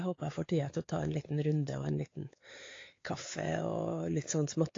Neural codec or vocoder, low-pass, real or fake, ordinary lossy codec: codec, 16 kHz, 4 kbps, X-Codec, WavLM features, trained on Multilingual LibriSpeech; 7.2 kHz; fake; AAC, 32 kbps